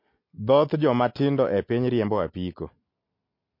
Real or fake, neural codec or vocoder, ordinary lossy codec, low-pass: real; none; MP3, 32 kbps; 5.4 kHz